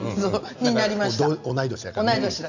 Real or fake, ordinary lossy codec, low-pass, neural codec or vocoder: real; none; 7.2 kHz; none